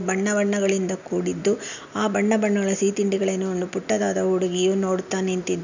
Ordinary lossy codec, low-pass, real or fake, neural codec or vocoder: none; 7.2 kHz; real; none